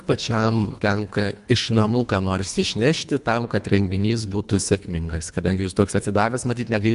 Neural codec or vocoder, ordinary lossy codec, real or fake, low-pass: codec, 24 kHz, 1.5 kbps, HILCodec; MP3, 96 kbps; fake; 10.8 kHz